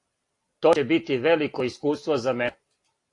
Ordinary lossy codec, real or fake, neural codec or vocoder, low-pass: AAC, 48 kbps; real; none; 10.8 kHz